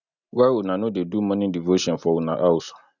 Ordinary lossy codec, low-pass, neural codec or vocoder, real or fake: none; 7.2 kHz; none; real